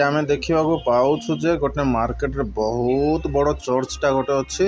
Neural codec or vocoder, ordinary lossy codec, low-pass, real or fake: none; none; none; real